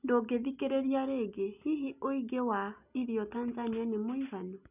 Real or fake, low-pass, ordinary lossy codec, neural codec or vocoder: real; 3.6 kHz; none; none